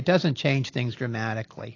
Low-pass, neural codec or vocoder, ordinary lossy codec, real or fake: 7.2 kHz; none; AAC, 32 kbps; real